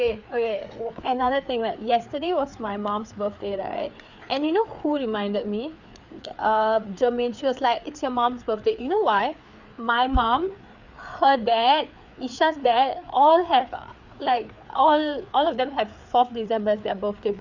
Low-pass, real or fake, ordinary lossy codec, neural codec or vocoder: 7.2 kHz; fake; none; codec, 16 kHz, 4 kbps, FreqCodec, larger model